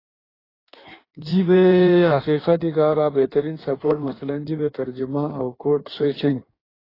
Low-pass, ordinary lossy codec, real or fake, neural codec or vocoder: 5.4 kHz; AAC, 24 kbps; fake; codec, 16 kHz in and 24 kHz out, 1.1 kbps, FireRedTTS-2 codec